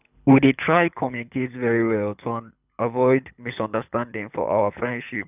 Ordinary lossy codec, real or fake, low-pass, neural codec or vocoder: none; fake; 3.6 kHz; codec, 24 kHz, 6 kbps, HILCodec